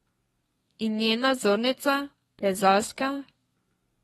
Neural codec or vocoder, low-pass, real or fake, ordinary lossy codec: codec, 32 kHz, 1.9 kbps, SNAC; 14.4 kHz; fake; AAC, 32 kbps